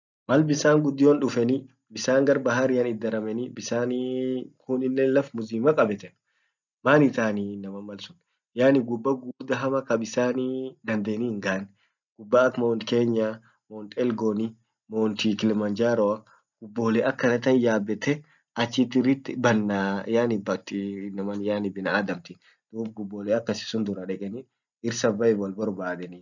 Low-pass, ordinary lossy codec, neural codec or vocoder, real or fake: 7.2 kHz; none; none; real